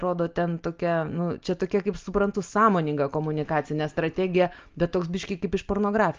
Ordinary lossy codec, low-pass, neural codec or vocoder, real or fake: Opus, 24 kbps; 7.2 kHz; none; real